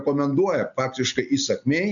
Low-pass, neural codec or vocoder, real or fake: 7.2 kHz; none; real